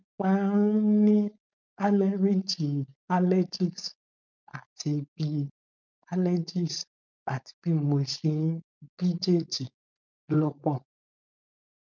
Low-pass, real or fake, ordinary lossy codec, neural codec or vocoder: 7.2 kHz; fake; none; codec, 16 kHz, 4.8 kbps, FACodec